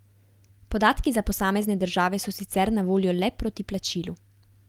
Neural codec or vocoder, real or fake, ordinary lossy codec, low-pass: none; real; Opus, 24 kbps; 19.8 kHz